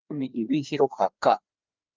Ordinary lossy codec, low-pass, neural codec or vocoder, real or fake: Opus, 24 kbps; 7.2 kHz; codec, 16 kHz, 2 kbps, FreqCodec, larger model; fake